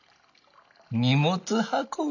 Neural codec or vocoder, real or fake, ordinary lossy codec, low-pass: none; real; none; 7.2 kHz